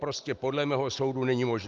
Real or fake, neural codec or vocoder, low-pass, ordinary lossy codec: real; none; 7.2 kHz; Opus, 32 kbps